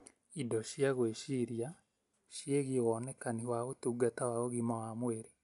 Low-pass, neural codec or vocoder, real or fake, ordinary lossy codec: 10.8 kHz; none; real; MP3, 96 kbps